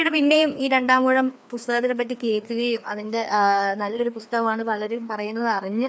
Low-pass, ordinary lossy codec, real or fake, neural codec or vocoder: none; none; fake; codec, 16 kHz, 2 kbps, FreqCodec, larger model